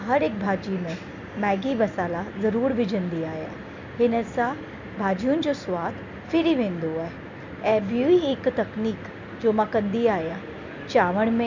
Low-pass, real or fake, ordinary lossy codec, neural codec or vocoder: 7.2 kHz; real; MP3, 64 kbps; none